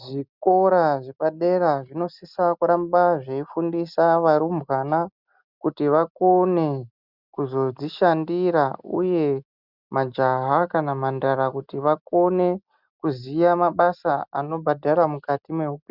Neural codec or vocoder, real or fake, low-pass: none; real; 5.4 kHz